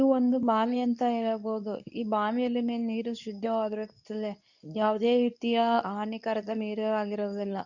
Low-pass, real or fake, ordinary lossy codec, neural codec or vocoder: 7.2 kHz; fake; none; codec, 24 kHz, 0.9 kbps, WavTokenizer, medium speech release version 2